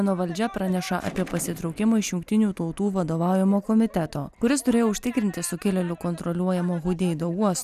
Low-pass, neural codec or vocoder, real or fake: 14.4 kHz; none; real